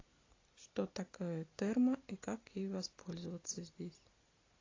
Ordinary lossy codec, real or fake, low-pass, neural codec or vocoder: AAC, 32 kbps; real; 7.2 kHz; none